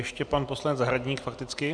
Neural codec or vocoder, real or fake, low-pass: none; real; 9.9 kHz